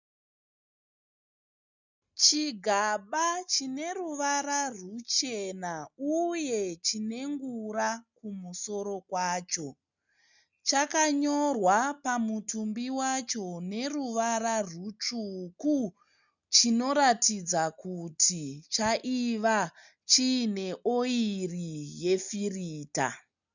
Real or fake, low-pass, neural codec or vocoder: real; 7.2 kHz; none